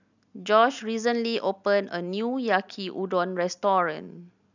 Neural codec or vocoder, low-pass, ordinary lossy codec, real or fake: none; 7.2 kHz; none; real